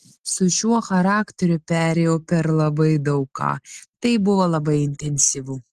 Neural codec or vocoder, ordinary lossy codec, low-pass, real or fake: none; Opus, 16 kbps; 14.4 kHz; real